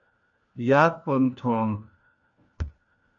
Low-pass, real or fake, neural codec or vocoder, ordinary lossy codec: 7.2 kHz; fake; codec, 16 kHz, 1 kbps, FunCodec, trained on LibriTTS, 50 frames a second; MP3, 48 kbps